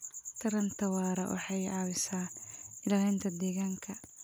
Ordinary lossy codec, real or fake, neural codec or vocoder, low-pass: none; real; none; none